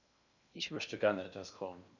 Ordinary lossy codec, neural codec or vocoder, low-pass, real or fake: none; codec, 16 kHz in and 24 kHz out, 0.8 kbps, FocalCodec, streaming, 65536 codes; 7.2 kHz; fake